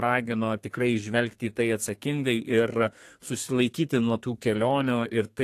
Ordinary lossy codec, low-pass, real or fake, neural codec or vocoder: AAC, 64 kbps; 14.4 kHz; fake; codec, 32 kHz, 1.9 kbps, SNAC